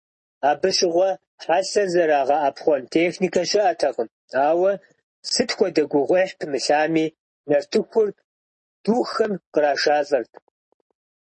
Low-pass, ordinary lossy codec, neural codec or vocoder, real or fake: 10.8 kHz; MP3, 32 kbps; none; real